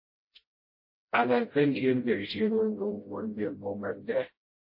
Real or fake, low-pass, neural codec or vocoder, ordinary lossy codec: fake; 5.4 kHz; codec, 16 kHz, 0.5 kbps, FreqCodec, smaller model; MP3, 24 kbps